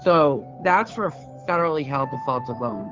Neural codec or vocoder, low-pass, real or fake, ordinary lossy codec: codec, 16 kHz, 2 kbps, FunCodec, trained on Chinese and English, 25 frames a second; 7.2 kHz; fake; Opus, 24 kbps